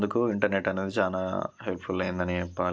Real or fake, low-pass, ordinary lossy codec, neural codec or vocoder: real; none; none; none